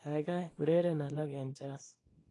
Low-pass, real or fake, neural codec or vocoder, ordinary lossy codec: 10.8 kHz; fake; codec, 24 kHz, 0.9 kbps, WavTokenizer, small release; AAC, 32 kbps